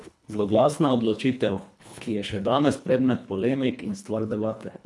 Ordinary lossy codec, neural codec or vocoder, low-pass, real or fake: none; codec, 24 kHz, 1.5 kbps, HILCodec; none; fake